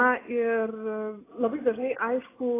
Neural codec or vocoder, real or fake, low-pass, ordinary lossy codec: none; real; 3.6 kHz; AAC, 16 kbps